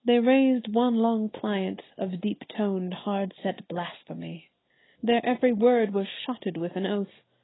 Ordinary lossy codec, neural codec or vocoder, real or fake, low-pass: AAC, 16 kbps; none; real; 7.2 kHz